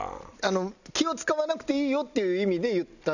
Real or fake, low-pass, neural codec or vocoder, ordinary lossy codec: real; 7.2 kHz; none; none